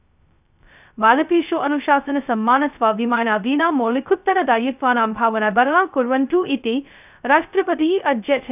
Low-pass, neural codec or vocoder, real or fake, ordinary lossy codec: 3.6 kHz; codec, 16 kHz, 0.2 kbps, FocalCodec; fake; none